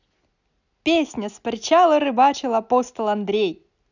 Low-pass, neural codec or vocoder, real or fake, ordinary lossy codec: 7.2 kHz; none; real; none